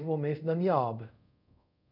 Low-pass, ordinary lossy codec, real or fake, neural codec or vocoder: 5.4 kHz; none; fake; codec, 24 kHz, 0.5 kbps, DualCodec